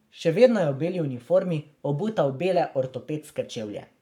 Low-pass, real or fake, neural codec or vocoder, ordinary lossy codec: 19.8 kHz; fake; codec, 44.1 kHz, 7.8 kbps, Pupu-Codec; none